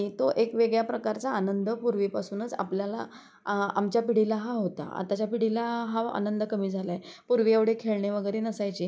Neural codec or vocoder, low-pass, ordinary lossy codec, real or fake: none; none; none; real